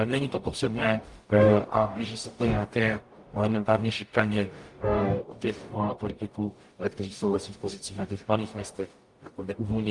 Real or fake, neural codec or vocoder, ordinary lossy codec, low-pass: fake; codec, 44.1 kHz, 0.9 kbps, DAC; Opus, 32 kbps; 10.8 kHz